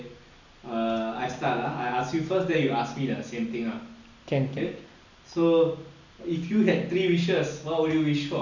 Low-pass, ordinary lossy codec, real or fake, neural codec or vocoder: 7.2 kHz; none; real; none